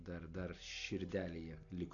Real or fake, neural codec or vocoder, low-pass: real; none; 7.2 kHz